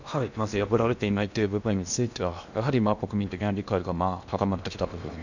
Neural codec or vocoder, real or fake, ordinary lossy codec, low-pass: codec, 16 kHz in and 24 kHz out, 0.8 kbps, FocalCodec, streaming, 65536 codes; fake; none; 7.2 kHz